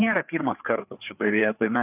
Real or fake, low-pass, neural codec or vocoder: fake; 3.6 kHz; codec, 16 kHz, 4 kbps, FreqCodec, larger model